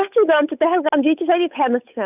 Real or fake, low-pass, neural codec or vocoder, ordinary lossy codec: fake; 3.6 kHz; codec, 16 kHz, 8 kbps, FunCodec, trained on Chinese and English, 25 frames a second; none